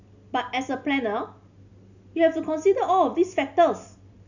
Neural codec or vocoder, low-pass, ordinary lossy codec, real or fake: none; 7.2 kHz; none; real